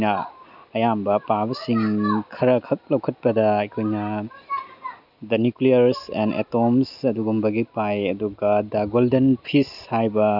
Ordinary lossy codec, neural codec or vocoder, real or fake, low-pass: none; none; real; 5.4 kHz